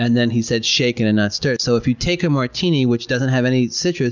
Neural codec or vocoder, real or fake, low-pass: none; real; 7.2 kHz